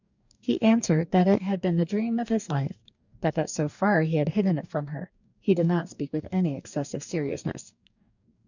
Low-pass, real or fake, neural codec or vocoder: 7.2 kHz; fake; codec, 44.1 kHz, 2.6 kbps, DAC